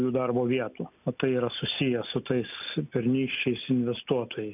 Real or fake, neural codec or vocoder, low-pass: real; none; 3.6 kHz